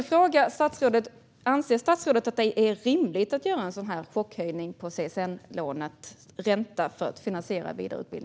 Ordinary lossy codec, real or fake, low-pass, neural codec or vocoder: none; real; none; none